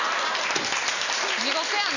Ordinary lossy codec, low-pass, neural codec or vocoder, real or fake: none; 7.2 kHz; none; real